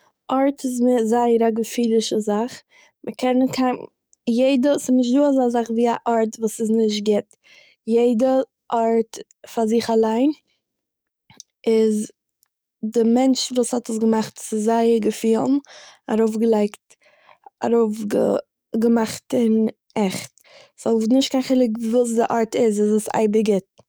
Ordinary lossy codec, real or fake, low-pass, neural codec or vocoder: none; fake; none; codec, 44.1 kHz, 7.8 kbps, DAC